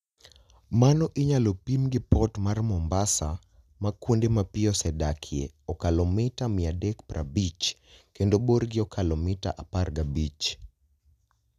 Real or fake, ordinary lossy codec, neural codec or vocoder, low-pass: real; none; none; 14.4 kHz